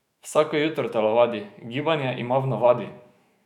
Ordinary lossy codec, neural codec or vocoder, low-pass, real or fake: none; autoencoder, 48 kHz, 128 numbers a frame, DAC-VAE, trained on Japanese speech; 19.8 kHz; fake